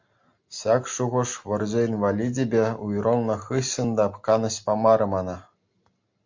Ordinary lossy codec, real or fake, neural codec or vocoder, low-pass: MP3, 48 kbps; real; none; 7.2 kHz